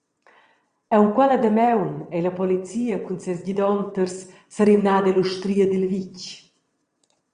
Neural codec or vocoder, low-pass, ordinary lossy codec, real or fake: vocoder, 22.05 kHz, 80 mel bands, WaveNeXt; 9.9 kHz; Opus, 64 kbps; fake